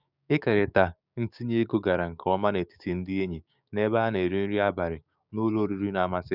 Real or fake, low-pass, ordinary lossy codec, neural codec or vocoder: fake; 5.4 kHz; none; codec, 16 kHz, 6 kbps, DAC